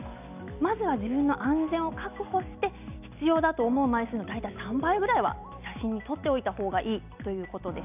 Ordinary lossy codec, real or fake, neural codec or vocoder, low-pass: none; real; none; 3.6 kHz